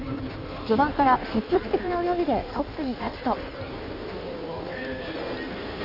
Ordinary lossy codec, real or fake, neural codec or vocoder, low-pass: none; fake; codec, 16 kHz in and 24 kHz out, 1.1 kbps, FireRedTTS-2 codec; 5.4 kHz